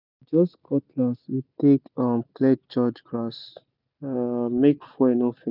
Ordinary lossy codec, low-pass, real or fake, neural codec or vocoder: none; 5.4 kHz; real; none